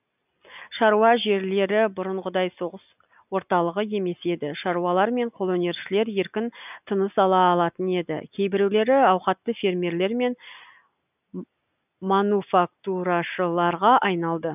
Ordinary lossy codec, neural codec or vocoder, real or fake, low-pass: none; none; real; 3.6 kHz